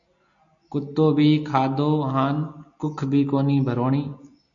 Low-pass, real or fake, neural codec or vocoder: 7.2 kHz; real; none